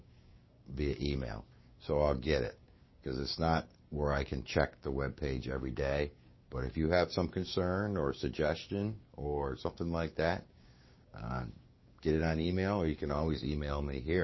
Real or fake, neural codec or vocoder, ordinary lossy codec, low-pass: fake; codec, 16 kHz, 6 kbps, DAC; MP3, 24 kbps; 7.2 kHz